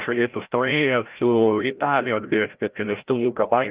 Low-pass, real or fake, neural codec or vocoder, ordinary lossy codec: 3.6 kHz; fake; codec, 16 kHz, 0.5 kbps, FreqCodec, larger model; Opus, 32 kbps